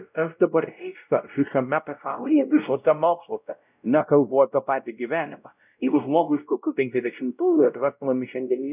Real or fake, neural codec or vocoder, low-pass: fake; codec, 16 kHz, 0.5 kbps, X-Codec, WavLM features, trained on Multilingual LibriSpeech; 3.6 kHz